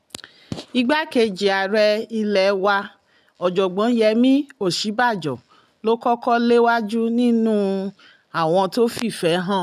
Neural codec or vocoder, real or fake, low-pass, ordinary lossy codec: none; real; 14.4 kHz; none